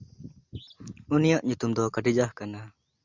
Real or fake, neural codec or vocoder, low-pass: real; none; 7.2 kHz